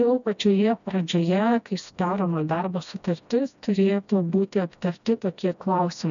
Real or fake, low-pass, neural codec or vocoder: fake; 7.2 kHz; codec, 16 kHz, 1 kbps, FreqCodec, smaller model